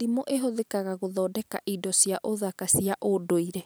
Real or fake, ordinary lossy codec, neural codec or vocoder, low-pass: real; none; none; none